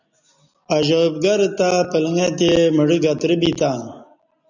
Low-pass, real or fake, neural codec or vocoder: 7.2 kHz; real; none